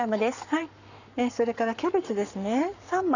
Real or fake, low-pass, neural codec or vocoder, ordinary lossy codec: fake; 7.2 kHz; codec, 44.1 kHz, 7.8 kbps, Pupu-Codec; none